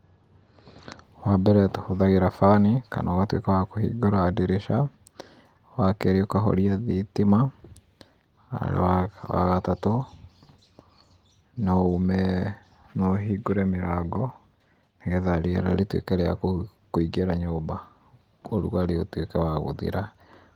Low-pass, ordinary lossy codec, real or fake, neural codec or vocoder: 19.8 kHz; Opus, 24 kbps; real; none